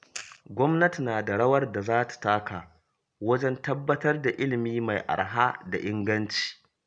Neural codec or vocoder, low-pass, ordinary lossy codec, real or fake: none; 9.9 kHz; none; real